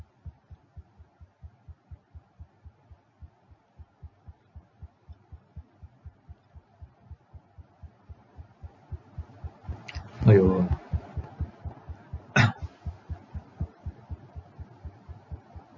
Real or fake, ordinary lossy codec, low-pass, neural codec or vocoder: real; MP3, 32 kbps; 7.2 kHz; none